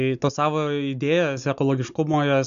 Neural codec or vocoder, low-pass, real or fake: none; 7.2 kHz; real